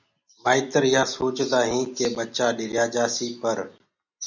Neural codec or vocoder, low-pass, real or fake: none; 7.2 kHz; real